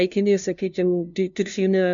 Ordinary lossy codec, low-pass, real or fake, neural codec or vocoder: MP3, 64 kbps; 7.2 kHz; fake; codec, 16 kHz, 0.5 kbps, FunCodec, trained on LibriTTS, 25 frames a second